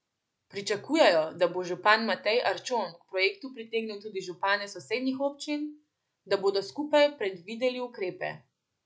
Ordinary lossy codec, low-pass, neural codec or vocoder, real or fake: none; none; none; real